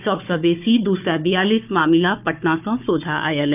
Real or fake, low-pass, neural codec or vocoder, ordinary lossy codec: fake; 3.6 kHz; codec, 16 kHz, 4 kbps, FunCodec, trained on Chinese and English, 50 frames a second; none